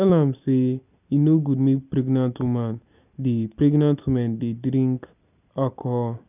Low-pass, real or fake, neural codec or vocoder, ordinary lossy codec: 3.6 kHz; real; none; none